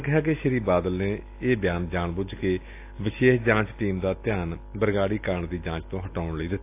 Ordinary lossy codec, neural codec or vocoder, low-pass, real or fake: AAC, 24 kbps; none; 3.6 kHz; real